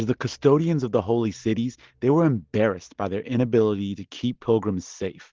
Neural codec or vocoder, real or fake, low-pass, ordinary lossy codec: none; real; 7.2 kHz; Opus, 16 kbps